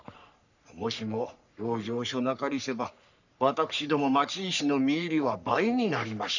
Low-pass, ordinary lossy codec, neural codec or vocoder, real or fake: 7.2 kHz; none; codec, 44.1 kHz, 3.4 kbps, Pupu-Codec; fake